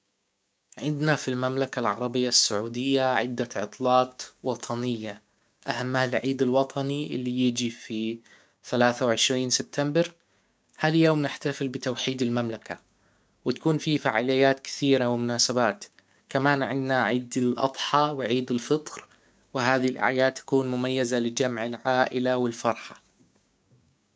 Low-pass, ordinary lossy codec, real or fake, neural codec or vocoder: none; none; fake; codec, 16 kHz, 6 kbps, DAC